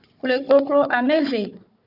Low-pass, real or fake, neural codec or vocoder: 5.4 kHz; fake; codec, 16 kHz, 4 kbps, FunCodec, trained on Chinese and English, 50 frames a second